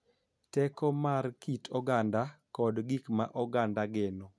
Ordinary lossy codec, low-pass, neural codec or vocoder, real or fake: none; none; none; real